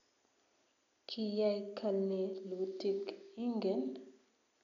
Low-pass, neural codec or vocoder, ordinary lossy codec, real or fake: 7.2 kHz; none; none; real